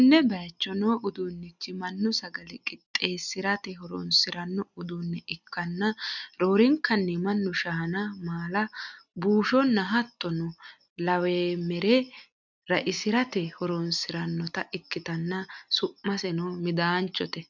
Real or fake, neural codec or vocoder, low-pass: real; none; 7.2 kHz